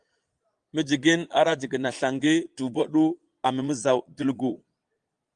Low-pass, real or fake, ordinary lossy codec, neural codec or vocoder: 9.9 kHz; fake; Opus, 32 kbps; vocoder, 22.05 kHz, 80 mel bands, Vocos